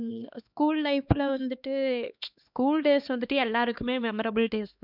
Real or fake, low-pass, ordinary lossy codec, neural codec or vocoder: fake; 5.4 kHz; none; codec, 16 kHz, 2 kbps, X-Codec, HuBERT features, trained on LibriSpeech